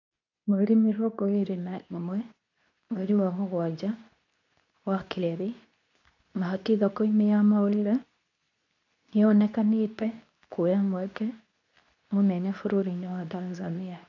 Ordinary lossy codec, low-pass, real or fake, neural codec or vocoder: none; 7.2 kHz; fake; codec, 24 kHz, 0.9 kbps, WavTokenizer, medium speech release version 2